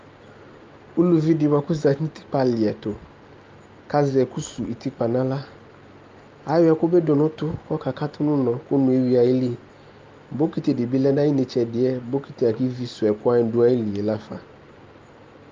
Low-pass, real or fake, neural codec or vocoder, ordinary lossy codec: 7.2 kHz; real; none; Opus, 24 kbps